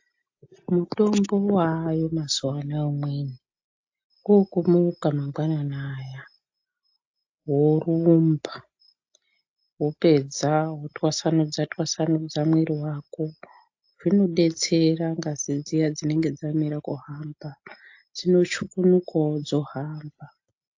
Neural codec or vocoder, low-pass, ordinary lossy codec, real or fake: none; 7.2 kHz; AAC, 48 kbps; real